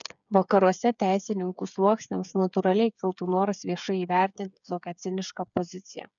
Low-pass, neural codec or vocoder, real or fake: 7.2 kHz; codec, 16 kHz, 8 kbps, FreqCodec, smaller model; fake